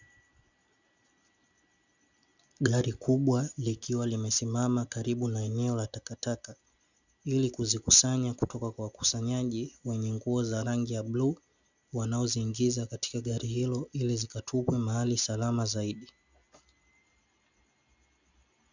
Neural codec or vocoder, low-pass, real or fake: none; 7.2 kHz; real